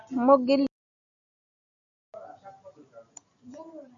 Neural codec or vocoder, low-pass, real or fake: none; 7.2 kHz; real